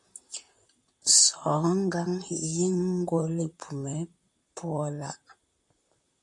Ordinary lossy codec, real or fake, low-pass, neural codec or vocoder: MP3, 64 kbps; fake; 10.8 kHz; vocoder, 44.1 kHz, 128 mel bands, Pupu-Vocoder